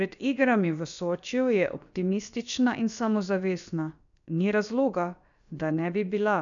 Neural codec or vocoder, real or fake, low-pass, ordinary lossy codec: codec, 16 kHz, about 1 kbps, DyCAST, with the encoder's durations; fake; 7.2 kHz; none